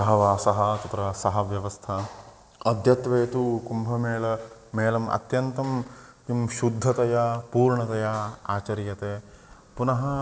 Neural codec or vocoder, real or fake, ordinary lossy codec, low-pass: none; real; none; none